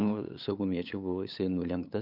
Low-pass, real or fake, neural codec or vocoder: 5.4 kHz; fake; codec, 16 kHz, 8 kbps, FunCodec, trained on LibriTTS, 25 frames a second